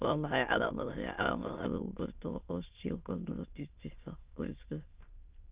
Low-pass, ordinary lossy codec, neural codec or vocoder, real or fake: 3.6 kHz; Opus, 24 kbps; autoencoder, 22.05 kHz, a latent of 192 numbers a frame, VITS, trained on many speakers; fake